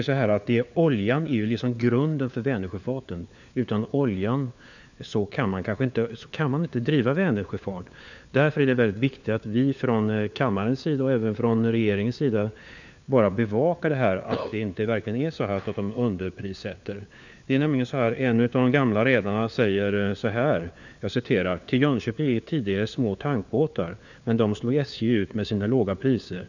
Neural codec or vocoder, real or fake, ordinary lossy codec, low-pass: codec, 16 kHz, 4 kbps, FunCodec, trained on LibriTTS, 50 frames a second; fake; none; 7.2 kHz